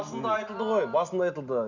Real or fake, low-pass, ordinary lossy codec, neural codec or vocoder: real; 7.2 kHz; none; none